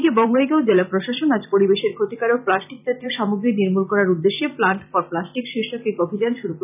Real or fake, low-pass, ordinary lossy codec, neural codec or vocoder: fake; 3.6 kHz; none; vocoder, 44.1 kHz, 128 mel bands every 256 samples, BigVGAN v2